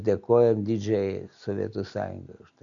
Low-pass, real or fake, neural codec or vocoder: 7.2 kHz; real; none